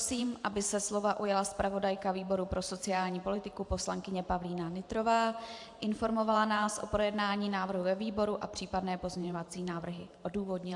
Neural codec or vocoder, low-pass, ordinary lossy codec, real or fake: vocoder, 44.1 kHz, 128 mel bands every 512 samples, BigVGAN v2; 10.8 kHz; AAC, 64 kbps; fake